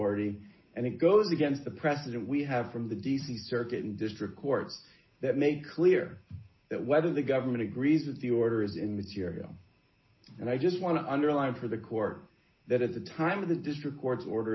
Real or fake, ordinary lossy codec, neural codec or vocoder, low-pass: real; MP3, 24 kbps; none; 7.2 kHz